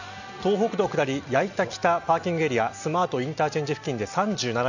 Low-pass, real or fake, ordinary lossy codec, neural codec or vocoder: 7.2 kHz; real; none; none